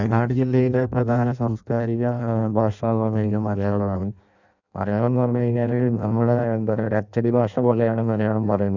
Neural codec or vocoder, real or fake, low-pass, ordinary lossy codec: codec, 16 kHz in and 24 kHz out, 0.6 kbps, FireRedTTS-2 codec; fake; 7.2 kHz; none